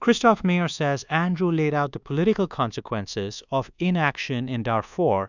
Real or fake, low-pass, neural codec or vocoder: fake; 7.2 kHz; codec, 24 kHz, 1.2 kbps, DualCodec